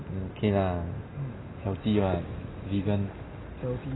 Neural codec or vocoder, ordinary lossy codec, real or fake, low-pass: none; AAC, 16 kbps; real; 7.2 kHz